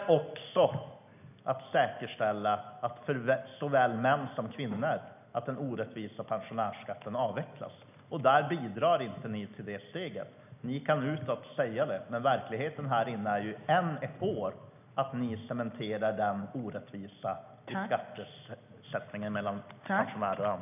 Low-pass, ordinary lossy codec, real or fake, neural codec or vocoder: 3.6 kHz; none; real; none